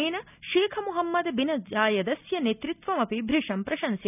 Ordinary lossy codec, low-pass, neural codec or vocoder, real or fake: none; 3.6 kHz; none; real